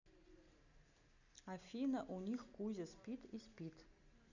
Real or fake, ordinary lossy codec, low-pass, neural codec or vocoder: real; none; 7.2 kHz; none